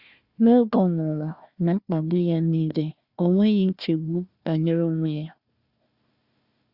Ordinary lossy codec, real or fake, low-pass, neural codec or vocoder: Opus, 64 kbps; fake; 5.4 kHz; codec, 16 kHz, 1 kbps, FunCodec, trained on Chinese and English, 50 frames a second